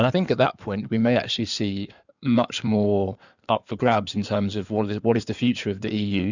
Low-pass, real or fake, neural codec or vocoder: 7.2 kHz; fake; codec, 16 kHz in and 24 kHz out, 2.2 kbps, FireRedTTS-2 codec